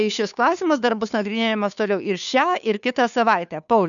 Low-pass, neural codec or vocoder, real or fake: 7.2 kHz; codec, 16 kHz, 2 kbps, FunCodec, trained on Chinese and English, 25 frames a second; fake